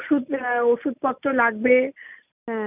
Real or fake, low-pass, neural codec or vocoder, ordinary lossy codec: real; 3.6 kHz; none; none